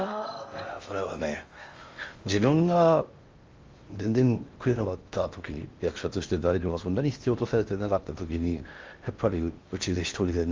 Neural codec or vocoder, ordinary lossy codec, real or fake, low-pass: codec, 16 kHz in and 24 kHz out, 0.6 kbps, FocalCodec, streaming, 4096 codes; Opus, 32 kbps; fake; 7.2 kHz